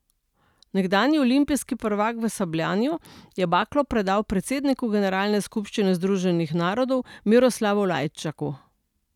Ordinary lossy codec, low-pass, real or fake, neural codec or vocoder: none; 19.8 kHz; real; none